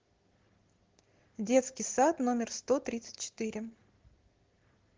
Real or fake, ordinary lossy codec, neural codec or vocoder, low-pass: real; Opus, 16 kbps; none; 7.2 kHz